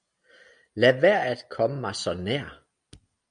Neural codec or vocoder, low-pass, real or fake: none; 9.9 kHz; real